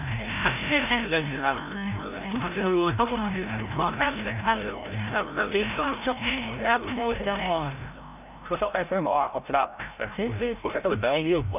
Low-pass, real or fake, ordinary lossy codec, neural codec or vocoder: 3.6 kHz; fake; none; codec, 16 kHz, 0.5 kbps, FreqCodec, larger model